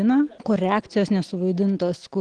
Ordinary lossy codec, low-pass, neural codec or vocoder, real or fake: Opus, 16 kbps; 9.9 kHz; none; real